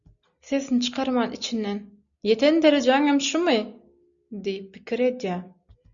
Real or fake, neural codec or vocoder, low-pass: real; none; 7.2 kHz